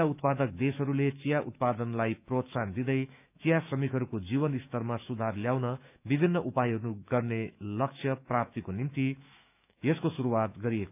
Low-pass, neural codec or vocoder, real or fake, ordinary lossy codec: 3.6 kHz; autoencoder, 48 kHz, 128 numbers a frame, DAC-VAE, trained on Japanese speech; fake; none